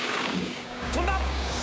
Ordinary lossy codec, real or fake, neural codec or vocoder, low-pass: none; fake; codec, 16 kHz, 6 kbps, DAC; none